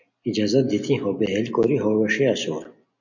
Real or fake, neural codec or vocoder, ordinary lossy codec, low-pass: real; none; MP3, 48 kbps; 7.2 kHz